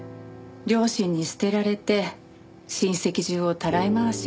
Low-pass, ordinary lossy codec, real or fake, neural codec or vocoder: none; none; real; none